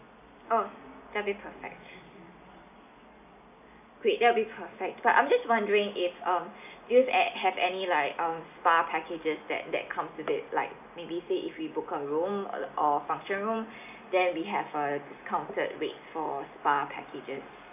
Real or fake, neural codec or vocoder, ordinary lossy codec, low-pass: real; none; none; 3.6 kHz